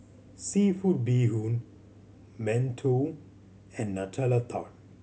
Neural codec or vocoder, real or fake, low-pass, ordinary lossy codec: none; real; none; none